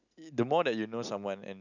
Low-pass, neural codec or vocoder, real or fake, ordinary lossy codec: 7.2 kHz; none; real; none